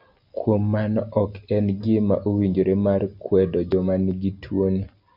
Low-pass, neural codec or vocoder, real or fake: 5.4 kHz; none; real